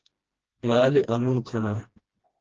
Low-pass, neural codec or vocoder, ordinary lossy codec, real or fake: 7.2 kHz; codec, 16 kHz, 1 kbps, FreqCodec, smaller model; Opus, 16 kbps; fake